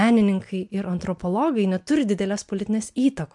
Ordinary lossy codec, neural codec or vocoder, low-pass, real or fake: MP3, 64 kbps; none; 10.8 kHz; real